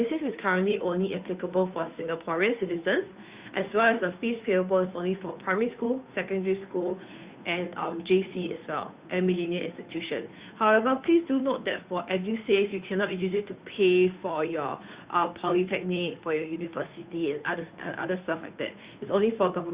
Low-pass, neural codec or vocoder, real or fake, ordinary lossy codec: 3.6 kHz; codec, 16 kHz, 2 kbps, FunCodec, trained on Chinese and English, 25 frames a second; fake; Opus, 64 kbps